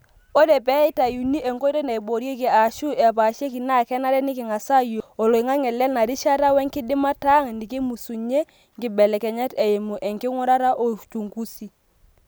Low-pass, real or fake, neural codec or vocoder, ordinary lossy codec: none; real; none; none